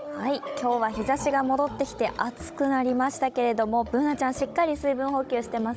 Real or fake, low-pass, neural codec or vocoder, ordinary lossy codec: fake; none; codec, 16 kHz, 16 kbps, FunCodec, trained on Chinese and English, 50 frames a second; none